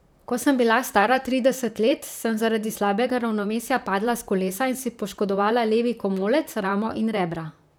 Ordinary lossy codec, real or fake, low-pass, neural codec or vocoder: none; fake; none; vocoder, 44.1 kHz, 128 mel bands, Pupu-Vocoder